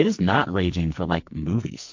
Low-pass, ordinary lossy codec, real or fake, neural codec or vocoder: 7.2 kHz; MP3, 48 kbps; fake; codec, 44.1 kHz, 2.6 kbps, SNAC